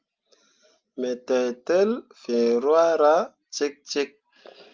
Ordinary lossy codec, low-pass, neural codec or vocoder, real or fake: Opus, 24 kbps; 7.2 kHz; none; real